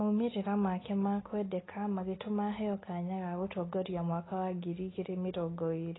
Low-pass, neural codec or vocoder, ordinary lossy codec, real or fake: 7.2 kHz; none; AAC, 16 kbps; real